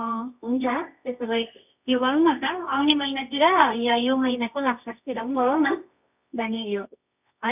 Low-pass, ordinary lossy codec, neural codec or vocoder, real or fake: 3.6 kHz; none; codec, 24 kHz, 0.9 kbps, WavTokenizer, medium music audio release; fake